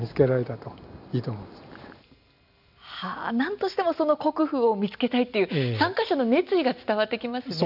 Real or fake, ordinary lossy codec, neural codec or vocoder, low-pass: real; none; none; 5.4 kHz